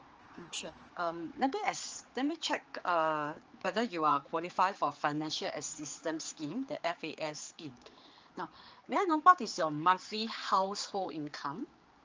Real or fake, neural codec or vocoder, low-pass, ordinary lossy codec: fake; codec, 16 kHz, 4 kbps, X-Codec, HuBERT features, trained on general audio; 7.2 kHz; Opus, 24 kbps